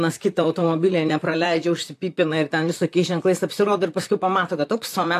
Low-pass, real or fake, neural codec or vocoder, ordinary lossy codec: 14.4 kHz; fake; vocoder, 44.1 kHz, 128 mel bands, Pupu-Vocoder; AAC, 64 kbps